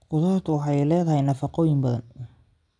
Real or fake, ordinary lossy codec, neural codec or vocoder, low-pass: real; none; none; 9.9 kHz